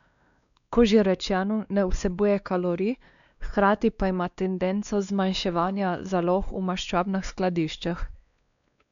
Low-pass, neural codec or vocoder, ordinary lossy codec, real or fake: 7.2 kHz; codec, 16 kHz, 2 kbps, X-Codec, WavLM features, trained on Multilingual LibriSpeech; none; fake